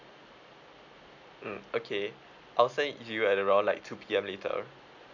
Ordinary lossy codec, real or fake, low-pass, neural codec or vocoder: none; real; 7.2 kHz; none